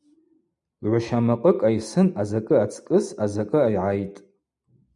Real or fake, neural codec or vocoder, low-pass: real; none; 10.8 kHz